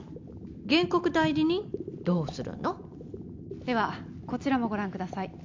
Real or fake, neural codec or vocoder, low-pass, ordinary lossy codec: real; none; 7.2 kHz; MP3, 64 kbps